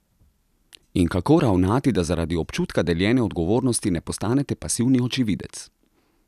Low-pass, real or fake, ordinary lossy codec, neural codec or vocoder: 14.4 kHz; real; none; none